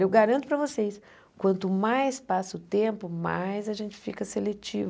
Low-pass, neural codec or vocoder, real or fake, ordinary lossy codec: none; none; real; none